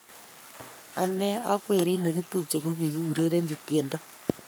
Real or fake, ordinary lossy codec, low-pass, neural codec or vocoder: fake; none; none; codec, 44.1 kHz, 3.4 kbps, Pupu-Codec